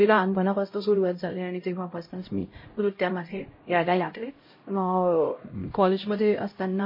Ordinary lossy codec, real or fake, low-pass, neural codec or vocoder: MP3, 24 kbps; fake; 5.4 kHz; codec, 16 kHz, 0.5 kbps, X-Codec, HuBERT features, trained on LibriSpeech